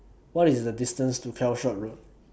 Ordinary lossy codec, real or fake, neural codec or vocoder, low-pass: none; real; none; none